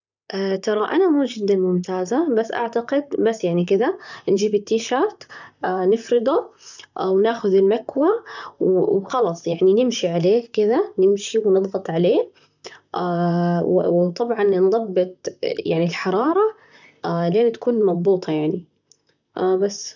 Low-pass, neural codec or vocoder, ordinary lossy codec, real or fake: 7.2 kHz; vocoder, 44.1 kHz, 128 mel bands, Pupu-Vocoder; none; fake